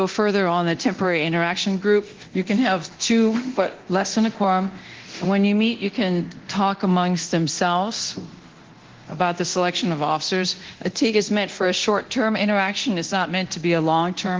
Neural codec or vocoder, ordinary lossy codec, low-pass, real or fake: codec, 24 kHz, 0.9 kbps, DualCodec; Opus, 16 kbps; 7.2 kHz; fake